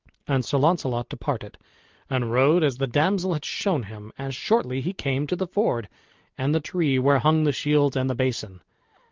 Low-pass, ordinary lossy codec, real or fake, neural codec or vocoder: 7.2 kHz; Opus, 32 kbps; real; none